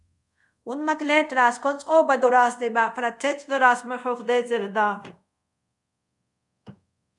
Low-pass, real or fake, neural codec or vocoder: 10.8 kHz; fake; codec, 24 kHz, 0.5 kbps, DualCodec